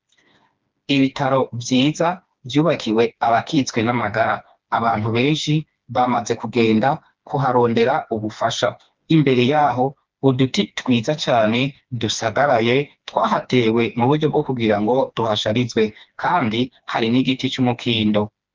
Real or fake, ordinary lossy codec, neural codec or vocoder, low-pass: fake; Opus, 24 kbps; codec, 16 kHz, 2 kbps, FreqCodec, smaller model; 7.2 kHz